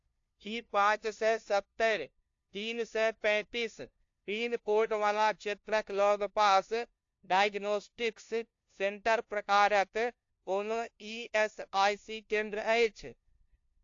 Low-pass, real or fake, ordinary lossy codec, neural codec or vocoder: 7.2 kHz; fake; AAC, 48 kbps; codec, 16 kHz, 0.5 kbps, FunCodec, trained on LibriTTS, 25 frames a second